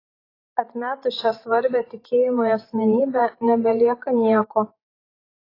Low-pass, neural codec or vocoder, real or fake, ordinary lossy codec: 5.4 kHz; vocoder, 44.1 kHz, 128 mel bands every 512 samples, BigVGAN v2; fake; AAC, 24 kbps